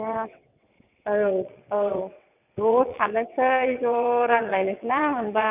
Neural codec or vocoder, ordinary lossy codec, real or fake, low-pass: vocoder, 22.05 kHz, 80 mel bands, WaveNeXt; none; fake; 3.6 kHz